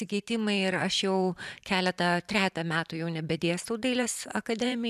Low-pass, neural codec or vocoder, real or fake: 14.4 kHz; vocoder, 44.1 kHz, 128 mel bands every 512 samples, BigVGAN v2; fake